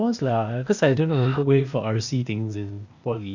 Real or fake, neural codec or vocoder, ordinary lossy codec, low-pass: fake; codec, 16 kHz, 0.8 kbps, ZipCodec; none; 7.2 kHz